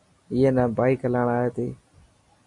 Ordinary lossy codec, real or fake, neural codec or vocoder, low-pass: AAC, 64 kbps; real; none; 10.8 kHz